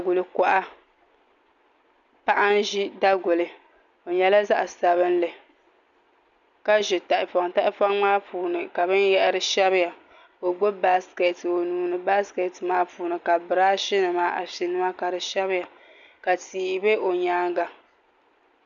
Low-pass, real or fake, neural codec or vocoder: 7.2 kHz; real; none